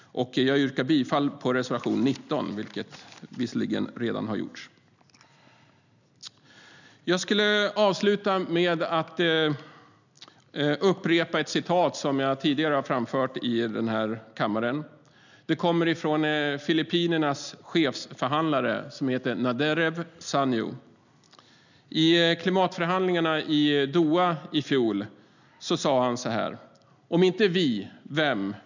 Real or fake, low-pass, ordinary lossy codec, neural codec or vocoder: real; 7.2 kHz; none; none